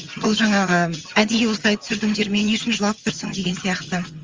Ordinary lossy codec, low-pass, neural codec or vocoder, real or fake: Opus, 32 kbps; 7.2 kHz; vocoder, 22.05 kHz, 80 mel bands, HiFi-GAN; fake